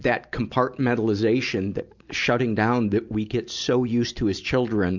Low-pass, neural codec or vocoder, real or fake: 7.2 kHz; none; real